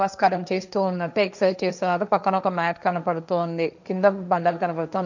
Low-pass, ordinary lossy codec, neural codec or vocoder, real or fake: 7.2 kHz; none; codec, 16 kHz, 1.1 kbps, Voila-Tokenizer; fake